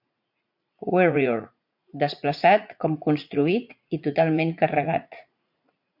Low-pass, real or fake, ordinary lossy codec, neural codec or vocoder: 5.4 kHz; fake; MP3, 48 kbps; vocoder, 44.1 kHz, 80 mel bands, Vocos